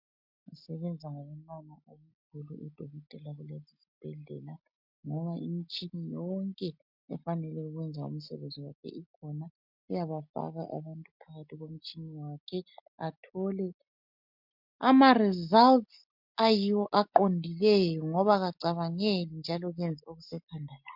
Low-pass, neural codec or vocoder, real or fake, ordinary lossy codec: 5.4 kHz; none; real; MP3, 48 kbps